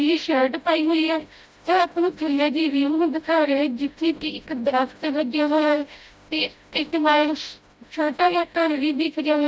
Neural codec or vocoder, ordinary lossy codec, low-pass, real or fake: codec, 16 kHz, 0.5 kbps, FreqCodec, smaller model; none; none; fake